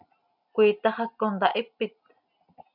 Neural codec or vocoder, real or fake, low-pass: none; real; 5.4 kHz